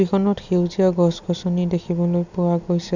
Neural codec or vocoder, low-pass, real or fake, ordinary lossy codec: none; 7.2 kHz; real; none